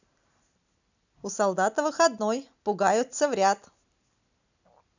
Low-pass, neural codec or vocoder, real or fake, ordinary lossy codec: 7.2 kHz; none; real; none